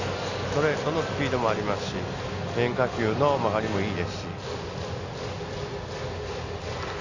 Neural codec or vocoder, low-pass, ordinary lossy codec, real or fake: none; 7.2 kHz; none; real